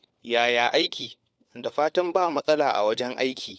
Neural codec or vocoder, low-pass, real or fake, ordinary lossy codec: codec, 16 kHz, 4 kbps, FunCodec, trained on LibriTTS, 50 frames a second; none; fake; none